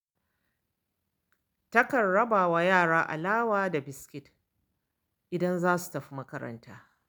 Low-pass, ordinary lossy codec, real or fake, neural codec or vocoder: none; none; real; none